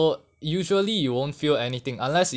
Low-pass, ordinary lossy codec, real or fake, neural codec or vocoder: none; none; real; none